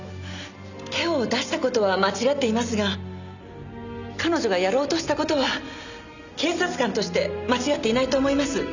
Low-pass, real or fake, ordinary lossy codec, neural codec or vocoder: 7.2 kHz; real; none; none